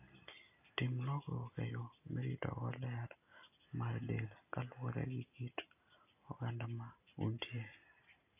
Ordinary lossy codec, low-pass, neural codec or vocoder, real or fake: AAC, 24 kbps; 3.6 kHz; none; real